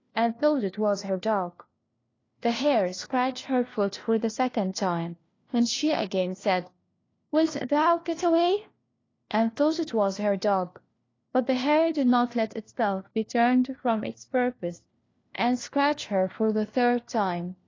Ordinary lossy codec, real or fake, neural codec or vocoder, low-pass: AAC, 32 kbps; fake; codec, 16 kHz, 1 kbps, FunCodec, trained on LibriTTS, 50 frames a second; 7.2 kHz